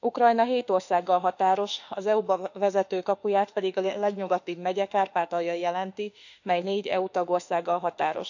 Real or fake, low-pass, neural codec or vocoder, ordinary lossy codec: fake; 7.2 kHz; autoencoder, 48 kHz, 32 numbers a frame, DAC-VAE, trained on Japanese speech; none